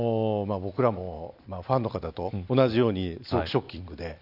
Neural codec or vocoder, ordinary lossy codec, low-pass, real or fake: none; none; 5.4 kHz; real